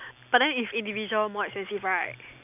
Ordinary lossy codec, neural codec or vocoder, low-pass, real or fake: none; none; 3.6 kHz; real